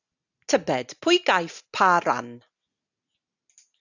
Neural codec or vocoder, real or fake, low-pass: none; real; 7.2 kHz